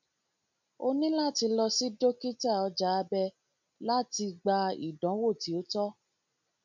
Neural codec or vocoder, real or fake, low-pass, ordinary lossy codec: none; real; 7.2 kHz; none